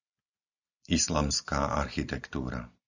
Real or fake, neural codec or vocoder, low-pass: real; none; 7.2 kHz